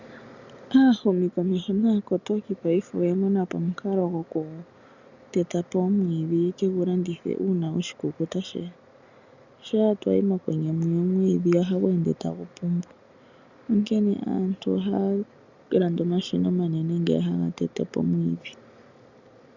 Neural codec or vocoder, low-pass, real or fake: none; 7.2 kHz; real